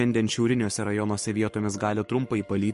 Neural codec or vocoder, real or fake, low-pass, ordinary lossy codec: codec, 44.1 kHz, 7.8 kbps, Pupu-Codec; fake; 14.4 kHz; MP3, 48 kbps